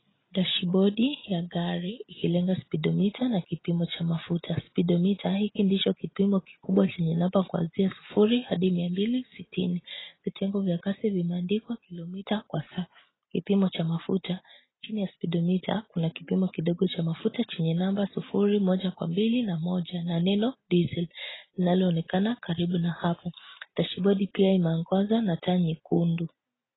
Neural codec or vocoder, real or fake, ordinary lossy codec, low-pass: none; real; AAC, 16 kbps; 7.2 kHz